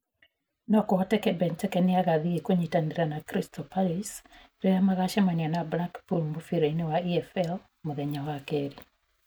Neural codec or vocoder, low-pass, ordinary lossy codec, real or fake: none; none; none; real